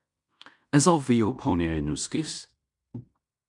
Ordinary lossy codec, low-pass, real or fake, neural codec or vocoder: MP3, 96 kbps; 10.8 kHz; fake; codec, 16 kHz in and 24 kHz out, 0.9 kbps, LongCat-Audio-Codec, fine tuned four codebook decoder